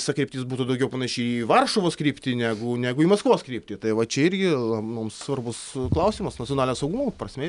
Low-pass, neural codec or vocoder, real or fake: 10.8 kHz; none; real